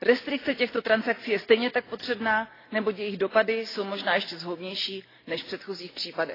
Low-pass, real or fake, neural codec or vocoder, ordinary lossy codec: 5.4 kHz; real; none; AAC, 24 kbps